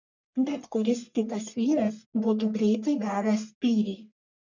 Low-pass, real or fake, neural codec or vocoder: 7.2 kHz; fake; codec, 44.1 kHz, 1.7 kbps, Pupu-Codec